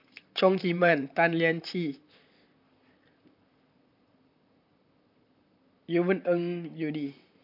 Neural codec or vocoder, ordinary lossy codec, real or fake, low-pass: vocoder, 44.1 kHz, 128 mel bands, Pupu-Vocoder; AAC, 48 kbps; fake; 5.4 kHz